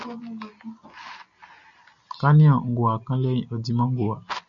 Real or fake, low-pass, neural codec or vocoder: real; 7.2 kHz; none